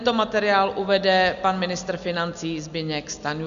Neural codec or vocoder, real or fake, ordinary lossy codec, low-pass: none; real; Opus, 64 kbps; 7.2 kHz